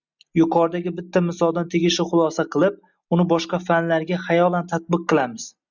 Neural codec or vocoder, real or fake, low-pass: none; real; 7.2 kHz